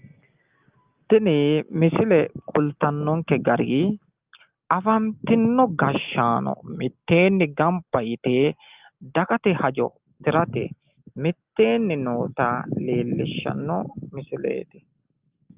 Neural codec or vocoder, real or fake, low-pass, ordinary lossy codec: none; real; 3.6 kHz; Opus, 32 kbps